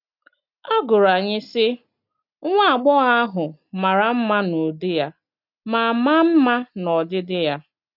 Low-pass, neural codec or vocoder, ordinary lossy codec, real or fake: 5.4 kHz; none; none; real